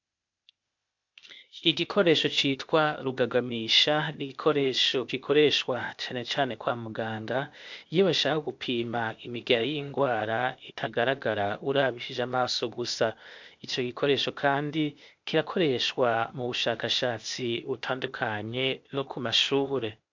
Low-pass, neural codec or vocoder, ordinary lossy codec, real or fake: 7.2 kHz; codec, 16 kHz, 0.8 kbps, ZipCodec; MP3, 64 kbps; fake